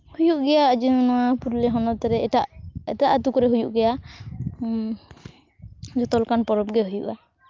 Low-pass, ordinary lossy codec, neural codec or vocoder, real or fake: 7.2 kHz; Opus, 24 kbps; none; real